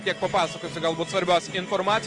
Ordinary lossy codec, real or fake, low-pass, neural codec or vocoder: Opus, 32 kbps; real; 10.8 kHz; none